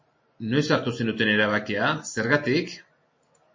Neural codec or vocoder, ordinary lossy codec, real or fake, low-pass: none; MP3, 32 kbps; real; 7.2 kHz